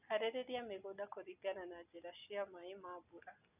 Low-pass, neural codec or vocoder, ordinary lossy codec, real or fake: 3.6 kHz; none; none; real